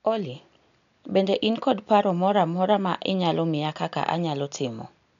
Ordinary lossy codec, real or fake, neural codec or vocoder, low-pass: none; real; none; 7.2 kHz